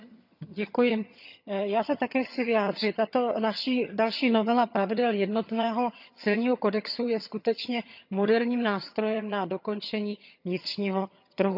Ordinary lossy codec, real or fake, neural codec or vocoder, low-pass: none; fake; vocoder, 22.05 kHz, 80 mel bands, HiFi-GAN; 5.4 kHz